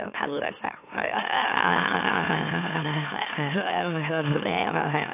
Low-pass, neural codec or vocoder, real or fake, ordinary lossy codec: 3.6 kHz; autoencoder, 44.1 kHz, a latent of 192 numbers a frame, MeloTTS; fake; AAC, 24 kbps